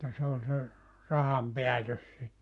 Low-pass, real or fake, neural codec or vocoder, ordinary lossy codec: 10.8 kHz; fake; codec, 44.1 kHz, 7.8 kbps, Pupu-Codec; none